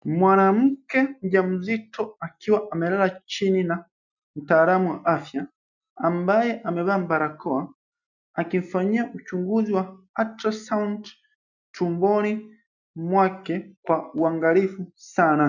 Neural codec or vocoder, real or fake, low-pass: none; real; 7.2 kHz